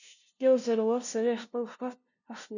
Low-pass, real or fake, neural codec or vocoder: 7.2 kHz; fake; codec, 16 kHz, 0.5 kbps, FunCodec, trained on LibriTTS, 25 frames a second